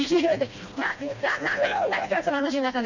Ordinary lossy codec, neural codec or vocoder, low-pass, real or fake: none; codec, 16 kHz, 1 kbps, FreqCodec, smaller model; 7.2 kHz; fake